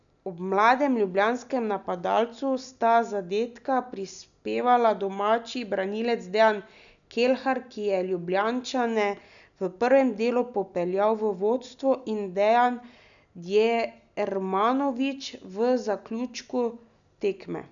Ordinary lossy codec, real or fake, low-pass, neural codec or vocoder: none; real; 7.2 kHz; none